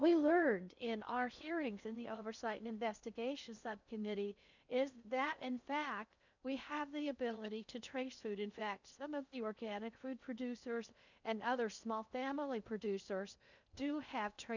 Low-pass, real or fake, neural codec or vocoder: 7.2 kHz; fake; codec, 16 kHz in and 24 kHz out, 0.6 kbps, FocalCodec, streaming, 4096 codes